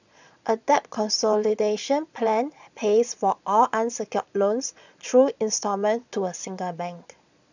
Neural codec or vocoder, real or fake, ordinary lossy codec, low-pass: vocoder, 22.05 kHz, 80 mel bands, Vocos; fake; none; 7.2 kHz